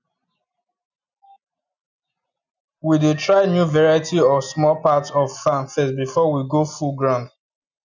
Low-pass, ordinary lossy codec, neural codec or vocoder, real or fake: 7.2 kHz; none; none; real